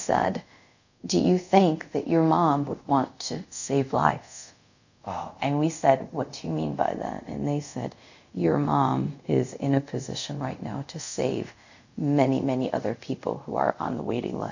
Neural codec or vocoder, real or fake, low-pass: codec, 24 kHz, 0.5 kbps, DualCodec; fake; 7.2 kHz